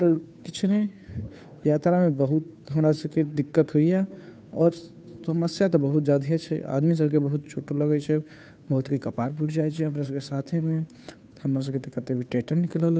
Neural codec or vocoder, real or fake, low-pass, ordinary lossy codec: codec, 16 kHz, 2 kbps, FunCodec, trained on Chinese and English, 25 frames a second; fake; none; none